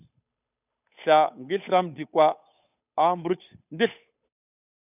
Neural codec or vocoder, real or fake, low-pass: codec, 16 kHz, 8 kbps, FunCodec, trained on Chinese and English, 25 frames a second; fake; 3.6 kHz